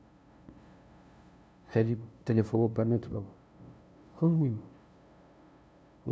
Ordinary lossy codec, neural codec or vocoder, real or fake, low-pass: none; codec, 16 kHz, 0.5 kbps, FunCodec, trained on LibriTTS, 25 frames a second; fake; none